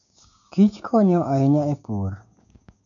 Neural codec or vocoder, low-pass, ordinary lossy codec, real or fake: codec, 16 kHz, 6 kbps, DAC; 7.2 kHz; none; fake